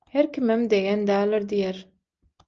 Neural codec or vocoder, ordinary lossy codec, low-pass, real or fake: none; Opus, 24 kbps; 7.2 kHz; real